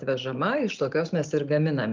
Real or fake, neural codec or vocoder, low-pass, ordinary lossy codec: real; none; 7.2 kHz; Opus, 32 kbps